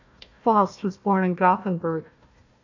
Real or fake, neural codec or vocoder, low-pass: fake; codec, 16 kHz, 1 kbps, FunCodec, trained on Chinese and English, 50 frames a second; 7.2 kHz